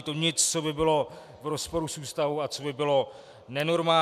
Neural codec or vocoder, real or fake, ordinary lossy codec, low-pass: none; real; MP3, 96 kbps; 14.4 kHz